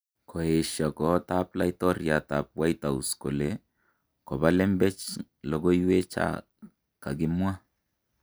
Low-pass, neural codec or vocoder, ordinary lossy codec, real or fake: none; none; none; real